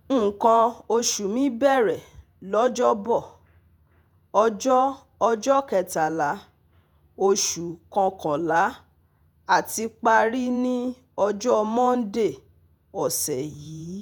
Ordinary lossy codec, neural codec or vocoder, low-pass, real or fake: none; vocoder, 48 kHz, 128 mel bands, Vocos; none; fake